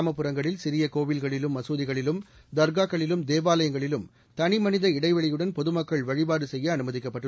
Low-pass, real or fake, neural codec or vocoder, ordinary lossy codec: none; real; none; none